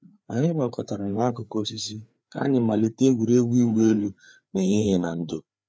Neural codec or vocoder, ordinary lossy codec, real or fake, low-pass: codec, 16 kHz, 4 kbps, FreqCodec, larger model; none; fake; none